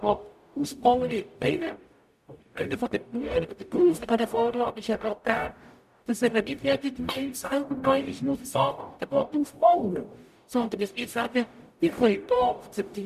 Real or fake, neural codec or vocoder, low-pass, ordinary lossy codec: fake; codec, 44.1 kHz, 0.9 kbps, DAC; 14.4 kHz; none